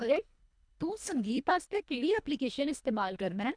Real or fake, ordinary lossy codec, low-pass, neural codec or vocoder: fake; none; 9.9 kHz; codec, 24 kHz, 1.5 kbps, HILCodec